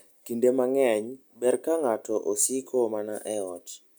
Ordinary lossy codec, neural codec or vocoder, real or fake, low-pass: none; none; real; none